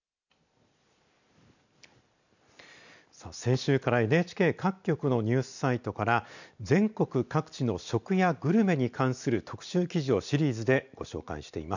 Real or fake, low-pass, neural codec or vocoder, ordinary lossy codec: real; 7.2 kHz; none; none